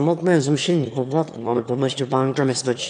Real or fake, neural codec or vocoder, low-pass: fake; autoencoder, 22.05 kHz, a latent of 192 numbers a frame, VITS, trained on one speaker; 9.9 kHz